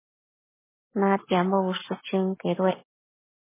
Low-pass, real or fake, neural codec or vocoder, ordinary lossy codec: 3.6 kHz; real; none; MP3, 16 kbps